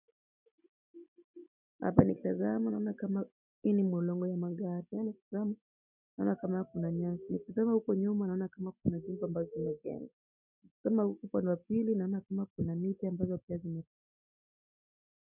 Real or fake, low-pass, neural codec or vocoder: real; 3.6 kHz; none